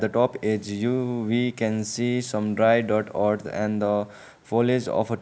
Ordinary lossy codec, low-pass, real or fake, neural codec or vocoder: none; none; real; none